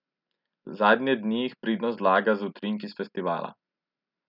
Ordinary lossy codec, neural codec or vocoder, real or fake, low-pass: none; none; real; 5.4 kHz